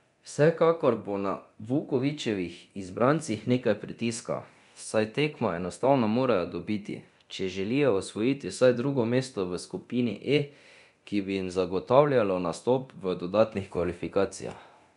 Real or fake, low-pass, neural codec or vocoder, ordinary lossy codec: fake; 10.8 kHz; codec, 24 kHz, 0.9 kbps, DualCodec; none